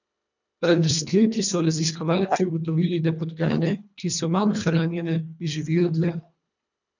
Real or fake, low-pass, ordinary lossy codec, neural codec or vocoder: fake; 7.2 kHz; none; codec, 24 kHz, 1.5 kbps, HILCodec